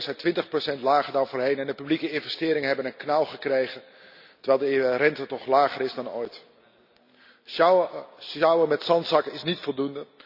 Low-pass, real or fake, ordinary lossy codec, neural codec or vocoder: 5.4 kHz; real; none; none